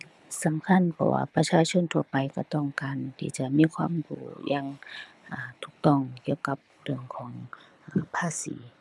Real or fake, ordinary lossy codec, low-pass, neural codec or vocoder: fake; none; none; codec, 24 kHz, 6 kbps, HILCodec